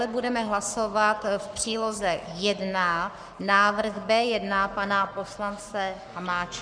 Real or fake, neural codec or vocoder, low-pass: fake; codec, 44.1 kHz, 7.8 kbps, Pupu-Codec; 9.9 kHz